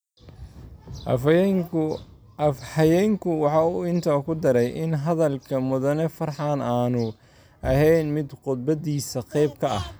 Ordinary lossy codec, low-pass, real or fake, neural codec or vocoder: none; none; real; none